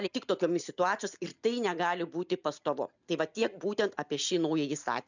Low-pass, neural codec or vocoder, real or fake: 7.2 kHz; none; real